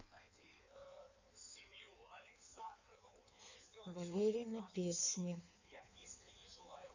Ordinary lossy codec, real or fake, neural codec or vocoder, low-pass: none; fake; codec, 16 kHz in and 24 kHz out, 1.1 kbps, FireRedTTS-2 codec; 7.2 kHz